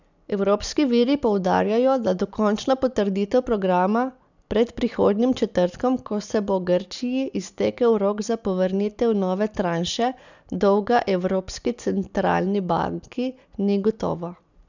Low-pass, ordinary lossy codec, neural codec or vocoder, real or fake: 7.2 kHz; none; none; real